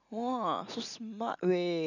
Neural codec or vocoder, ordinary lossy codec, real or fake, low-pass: none; none; real; 7.2 kHz